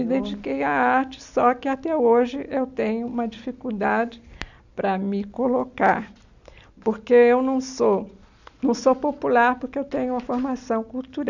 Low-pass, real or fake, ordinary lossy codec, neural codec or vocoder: 7.2 kHz; real; none; none